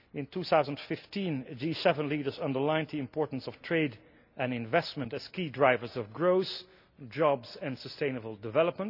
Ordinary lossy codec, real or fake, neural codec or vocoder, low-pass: none; real; none; 5.4 kHz